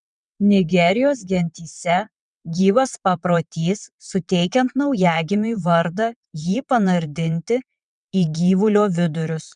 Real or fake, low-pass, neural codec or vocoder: fake; 9.9 kHz; vocoder, 22.05 kHz, 80 mel bands, WaveNeXt